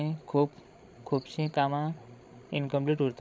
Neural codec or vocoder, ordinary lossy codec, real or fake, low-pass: codec, 16 kHz, 16 kbps, FreqCodec, larger model; none; fake; none